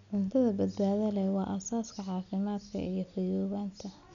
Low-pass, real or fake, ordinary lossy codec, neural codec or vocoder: 7.2 kHz; real; none; none